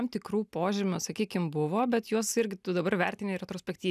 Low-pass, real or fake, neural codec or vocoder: 14.4 kHz; real; none